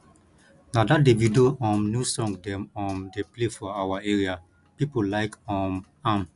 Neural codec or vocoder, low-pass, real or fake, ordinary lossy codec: none; 10.8 kHz; real; none